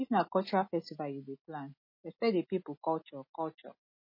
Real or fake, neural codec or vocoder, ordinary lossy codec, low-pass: real; none; MP3, 24 kbps; 5.4 kHz